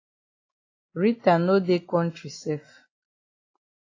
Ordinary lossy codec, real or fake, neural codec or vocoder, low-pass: AAC, 32 kbps; real; none; 7.2 kHz